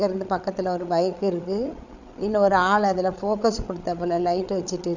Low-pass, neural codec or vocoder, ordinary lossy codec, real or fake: 7.2 kHz; codec, 16 kHz, 8 kbps, FreqCodec, larger model; none; fake